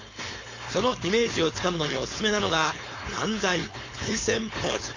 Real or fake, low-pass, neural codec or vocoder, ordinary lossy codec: fake; 7.2 kHz; codec, 16 kHz, 4.8 kbps, FACodec; MP3, 48 kbps